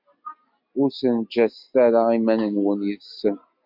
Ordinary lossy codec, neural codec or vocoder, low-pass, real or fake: MP3, 48 kbps; none; 5.4 kHz; real